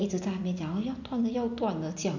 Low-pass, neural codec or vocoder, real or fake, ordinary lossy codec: 7.2 kHz; none; real; none